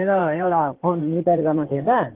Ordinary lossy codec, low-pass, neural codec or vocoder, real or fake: Opus, 16 kbps; 3.6 kHz; codec, 16 kHz in and 24 kHz out, 2.2 kbps, FireRedTTS-2 codec; fake